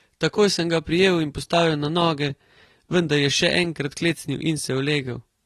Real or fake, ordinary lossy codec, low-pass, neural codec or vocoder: real; AAC, 32 kbps; 19.8 kHz; none